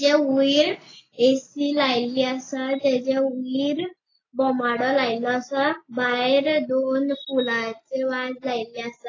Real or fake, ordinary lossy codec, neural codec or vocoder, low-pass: real; AAC, 32 kbps; none; 7.2 kHz